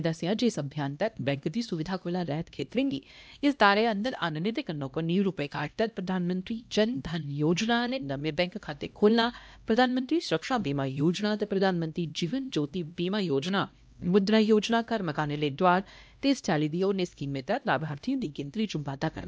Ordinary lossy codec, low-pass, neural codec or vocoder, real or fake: none; none; codec, 16 kHz, 1 kbps, X-Codec, HuBERT features, trained on LibriSpeech; fake